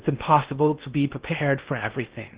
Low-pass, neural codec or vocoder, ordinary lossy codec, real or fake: 3.6 kHz; codec, 16 kHz in and 24 kHz out, 0.6 kbps, FocalCodec, streaming, 2048 codes; Opus, 16 kbps; fake